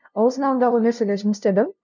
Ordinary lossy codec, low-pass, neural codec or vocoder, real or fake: none; 7.2 kHz; codec, 16 kHz, 0.5 kbps, FunCodec, trained on LibriTTS, 25 frames a second; fake